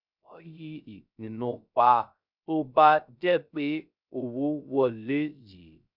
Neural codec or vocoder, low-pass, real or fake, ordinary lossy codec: codec, 16 kHz, 0.3 kbps, FocalCodec; 5.4 kHz; fake; none